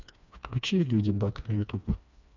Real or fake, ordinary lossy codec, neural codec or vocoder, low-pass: fake; none; codec, 16 kHz, 2 kbps, FreqCodec, smaller model; 7.2 kHz